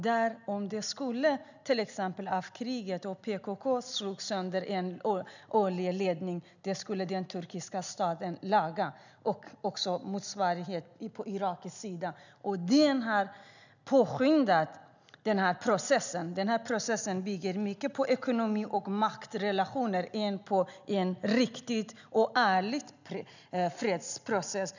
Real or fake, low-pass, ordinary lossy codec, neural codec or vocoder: real; 7.2 kHz; none; none